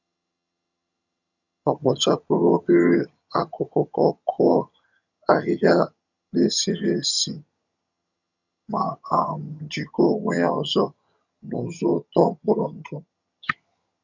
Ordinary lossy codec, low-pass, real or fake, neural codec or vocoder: none; 7.2 kHz; fake; vocoder, 22.05 kHz, 80 mel bands, HiFi-GAN